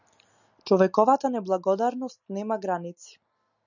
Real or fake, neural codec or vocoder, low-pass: real; none; 7.2 kHz